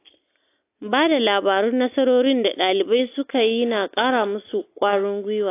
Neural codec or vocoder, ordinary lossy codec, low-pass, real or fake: none; AAC, 24 kbps; 3.6 kHz; real